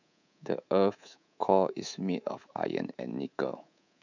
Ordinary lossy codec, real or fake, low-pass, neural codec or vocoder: none; fake; 7.2 kHz; codec, 24 kHz, 3.1 kbps, DualCodec